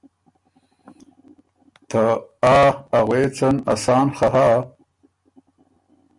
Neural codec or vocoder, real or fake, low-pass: none; real; 10.8 kHz